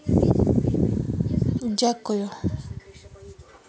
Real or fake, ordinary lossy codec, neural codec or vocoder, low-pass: real; none; none; none